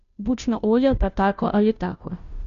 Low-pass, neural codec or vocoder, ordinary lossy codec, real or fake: 7.2 kHz; codec, 16 kHz, 0.5 kbps, FunCodec, trained on Chinese and English, 25 frames a second; none; fake